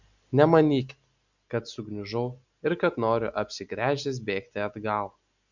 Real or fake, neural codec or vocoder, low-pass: real; none; 7.2 kHz